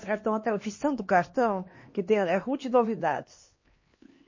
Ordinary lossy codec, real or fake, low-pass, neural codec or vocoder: MP3, 32 kbps; fake; 7.2 kHz; codec, 16 kHz, 2 kbps, X-Codec, HuBERT features, trained on LibriSpeech